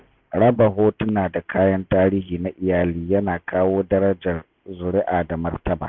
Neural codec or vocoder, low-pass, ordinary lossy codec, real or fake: none; 7.2 kHz; none; real